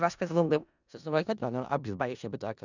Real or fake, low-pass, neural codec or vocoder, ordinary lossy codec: fake; 7.2 kHz; codec, 16 kHz in and 24 kHz out, 0.4 kbps, LongCat-Audio-Codec, four codebook decoder; none